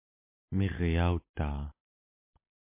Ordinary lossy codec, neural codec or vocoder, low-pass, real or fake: MP3, 32 kbps; none; 3.6 kHz; real